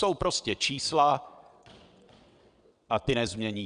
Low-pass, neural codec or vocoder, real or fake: 9.9 kHz; vocoder, 22.05 kHz, 80 mel bands, WaveNeXt; fake